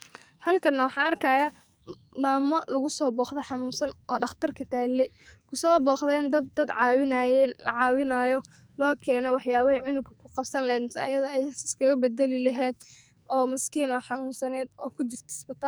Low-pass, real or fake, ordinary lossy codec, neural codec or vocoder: none; fake; none; codec, 44.1 kHz, 2.6 kbps, SNAC